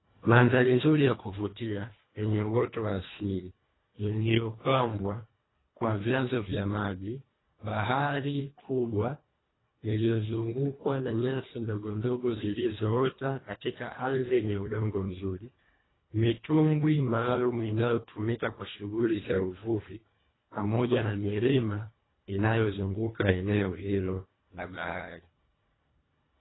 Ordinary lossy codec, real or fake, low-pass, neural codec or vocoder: AAC, 16 kbps; fake; 7.2 kHz; codec, 24 kHz, 1.5 kbps, HILCodec